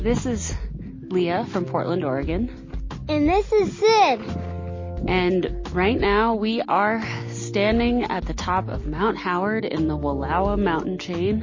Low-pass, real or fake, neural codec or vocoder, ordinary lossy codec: 7.2 kHz; real; none; MP3, 32 kbps